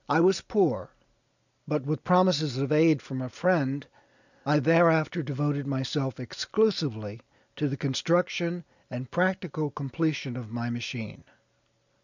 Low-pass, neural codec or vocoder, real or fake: 7.2 kHz; none; real